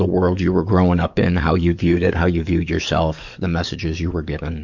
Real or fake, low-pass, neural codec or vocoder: fake; 7.2 kHz; codec, 44.1 kHz, 7.8 kbps, DAC